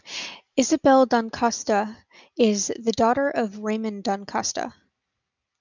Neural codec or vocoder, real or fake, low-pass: none; real; 7.2 kHz